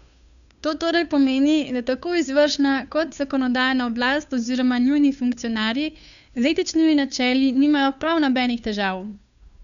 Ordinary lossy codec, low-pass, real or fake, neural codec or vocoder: none; 7.2 kHz; fake; codec, 16 kHz, 2 kbps, FunCodec, trained on Chinese and English, 25 frames a second